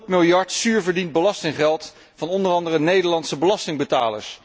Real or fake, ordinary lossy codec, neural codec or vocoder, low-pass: real; none; none; none